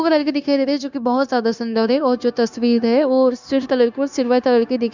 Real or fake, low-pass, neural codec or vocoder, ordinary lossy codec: fake; 7.2 kHz; codec, 16 kHz, 0.9 kbps, LongCat-Audio-Codec; none